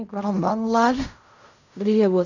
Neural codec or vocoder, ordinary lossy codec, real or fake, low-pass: codec, 16 kHz in and 24 kHz out, 0.4 kbps, LongCat-Audio-Codec, fine tuned four codebook decoder; none; fake; 7.2 kHz